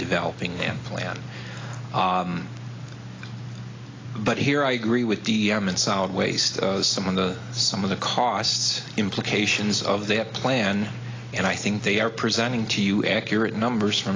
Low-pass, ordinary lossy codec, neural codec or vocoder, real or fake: 7.2 kHz; AAC, 32 kbps; none; real